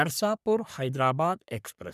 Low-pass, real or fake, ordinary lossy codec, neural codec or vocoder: 14.4 kHz; fake; none; codec, 44.1 kHz, 3.4 kbps, Pupu-Codec